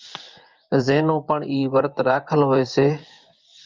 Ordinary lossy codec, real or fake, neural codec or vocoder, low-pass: Opus, 24 kbps; fake; vocoder, 24 kHz, 100 mel bands, Vocos; 7.2 kHz